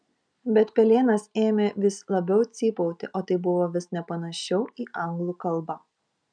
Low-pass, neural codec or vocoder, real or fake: 9.9 kHz; none; real